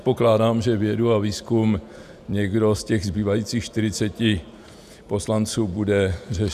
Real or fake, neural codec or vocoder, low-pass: fake; vocoder, 48 kHz, 128 mel bands, Vocos; 14.4 kHz